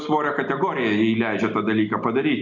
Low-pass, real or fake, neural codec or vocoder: 7.2 kHz; real; none